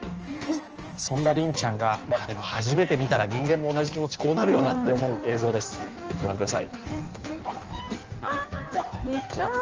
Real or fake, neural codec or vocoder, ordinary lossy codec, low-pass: fake; codec, 16 kHz in and 24 kHz out, 1.1 kbps, FireRedTTS-2 codec; Opus, 24 kbps; 7.2 kHz